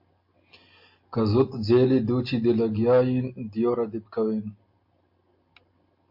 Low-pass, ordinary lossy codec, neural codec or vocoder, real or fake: 5.4 kHz; MP3, 32 kbps; none; real